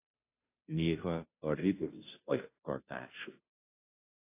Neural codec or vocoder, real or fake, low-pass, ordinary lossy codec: codec, 16 kHz, 0.5 kbps, FunCodec, trained on Chinese and English, 25 frames a second; fake; 3.6 kHz; AAC, 24 kbps